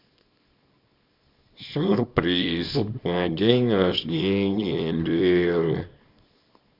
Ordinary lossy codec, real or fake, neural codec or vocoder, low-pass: none; fake; codec, 24 kHz, 0.9 kbps, WavTokenizer, small release; 5.4 kHz